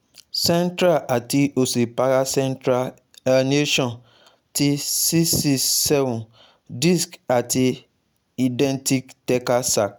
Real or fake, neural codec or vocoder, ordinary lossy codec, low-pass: real; none; none; none